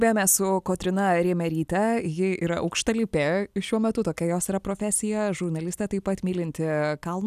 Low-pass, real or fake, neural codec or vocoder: 14.4 kHz; real; none